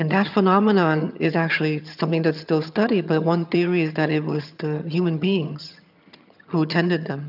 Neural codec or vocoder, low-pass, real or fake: vocoder, 22.05 kHz, 80 mel bands, HiFi-GAN; 5.4 kHz; fake